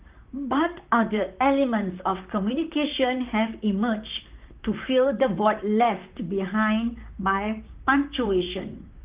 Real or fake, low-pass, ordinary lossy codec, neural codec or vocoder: fake; 3.6 kHz; Opus, 32 kbps; vocoder, 44.1 kHz, 128 mel bands, Pupu-Vocoder